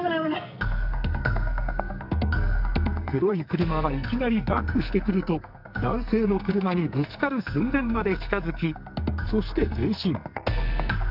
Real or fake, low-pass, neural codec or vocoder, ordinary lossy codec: fake; 5.4 kHz; codec, 44.1 kHz, 2.6 kbps, SNAC; none